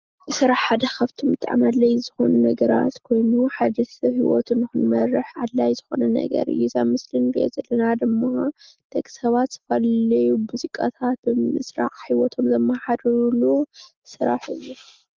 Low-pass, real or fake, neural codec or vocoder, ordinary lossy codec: 7.2 kHz; real; none; Opus, 32 kbps